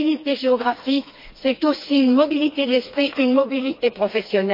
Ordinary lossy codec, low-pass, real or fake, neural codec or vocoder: MP3, 32 kbps; 5.4 kHz; fake; codec, 16 kHz, 2 kbps, FreqCodec, smaller model